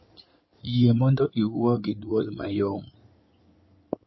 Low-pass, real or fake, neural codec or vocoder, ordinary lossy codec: 7.2 kHz; fake; codec, 16 kHz in and 24 kHz out, 2.2 kbps, FireRedTTS-2 codec; MP3, 24 kbps